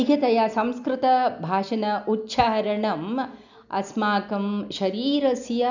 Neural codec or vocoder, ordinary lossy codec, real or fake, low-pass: none; none; real; 7.2 kHz